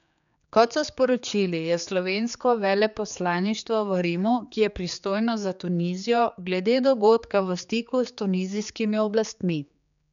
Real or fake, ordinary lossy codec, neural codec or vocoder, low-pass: fake; none; codec, 16 kHz, 4 kbps, X-Codec, HuBERT features, trained on general audio; 7.2 kHz